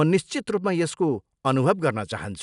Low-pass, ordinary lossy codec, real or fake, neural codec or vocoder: 10.8 kHz; none; real; none